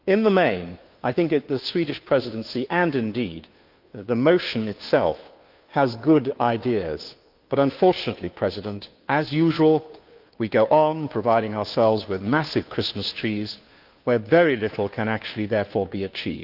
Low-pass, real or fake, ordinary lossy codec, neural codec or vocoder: 5.4 kHz; fake; Opus, 24 kbps; codec, 16 kHz, 2 kbps, FunCodec, trained on Chinese and English, 25 frames a second